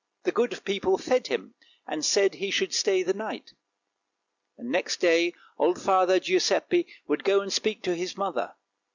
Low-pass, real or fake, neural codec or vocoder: 7.2 kHz; real; none